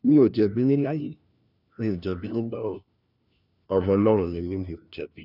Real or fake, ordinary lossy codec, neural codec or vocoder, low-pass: fake; none; codec, 16 kHz, 1 kbps, FunCodec, trained on LibriTTS, 50 frames a second; 5.4 kHz